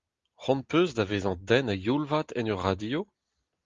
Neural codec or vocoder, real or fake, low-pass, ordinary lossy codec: none; real; 7.2 kHz; Opus, 32 kbps